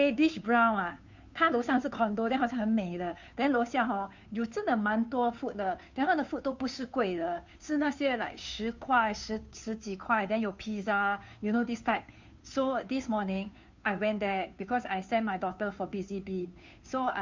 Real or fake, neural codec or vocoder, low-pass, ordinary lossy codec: fake; codec, 16 kHz, 2 kbps, FunCodec, trained on Chinese and English, 25 frames a second; 7.2 kHz; MP3, 64 kbps